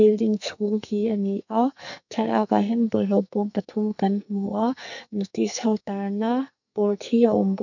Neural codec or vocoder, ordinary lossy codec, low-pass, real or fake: codec, 44.1 kHz, 2.6 kbps, SNAC; none; 7.2 kHz; fake